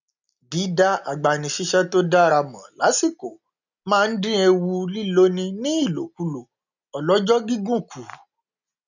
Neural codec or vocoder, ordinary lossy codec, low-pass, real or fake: none; none; 7.2 kHz; real